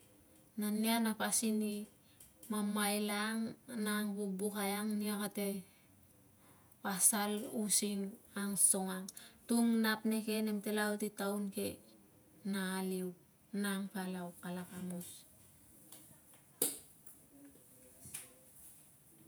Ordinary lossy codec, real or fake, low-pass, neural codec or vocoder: none; fake; none; vocoder, 48 kHz, 128 mel bands, Vocos